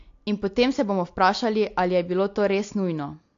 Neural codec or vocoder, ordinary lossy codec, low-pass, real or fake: none; AAC, 48 kbps; 7.2 kHz; real